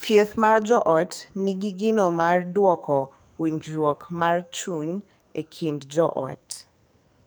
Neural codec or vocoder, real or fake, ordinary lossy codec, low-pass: codec, 44.1 kHz, 2.6 kbps, SNAC; fake; none; none